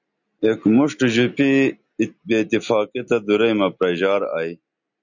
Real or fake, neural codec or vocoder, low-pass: real; none; 7.2 kHz